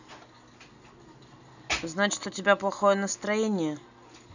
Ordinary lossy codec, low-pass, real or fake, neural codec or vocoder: none; 7.2 kHz; real; none